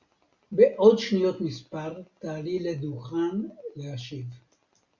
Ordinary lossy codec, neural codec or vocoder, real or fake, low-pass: Opus, 64 kbps; none; real; 7.2 kHz